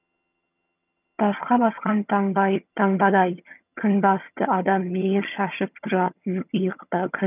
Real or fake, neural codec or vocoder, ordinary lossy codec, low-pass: fake; vocoder, 22.05 kHz, 80 mel bands, HiFi-GAN; none; 3.6 kHz